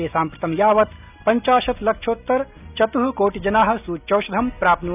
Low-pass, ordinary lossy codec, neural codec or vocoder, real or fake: 3.6 kHz; none; none; real